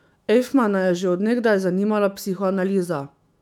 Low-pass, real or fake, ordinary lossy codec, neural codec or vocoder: 19.8 kHz; fake; none; autoencoder, 48 kHz, 128 numbers a frame, DAC-VAE, trained on Japanese speech